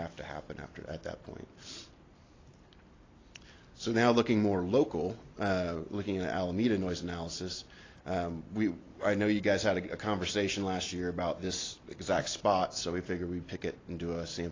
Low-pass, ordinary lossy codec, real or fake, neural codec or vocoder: 7.2 kHz; AAC, 32 kbps; real; none